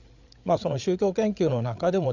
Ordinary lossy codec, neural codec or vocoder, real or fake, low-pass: none; codec, 16 kHz, 8 kbps, FreqCodec, larger model; fake; 7.2 kHz